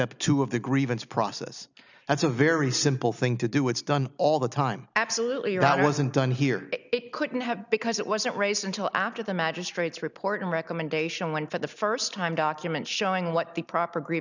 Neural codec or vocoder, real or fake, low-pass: none; real; 7.2 kHz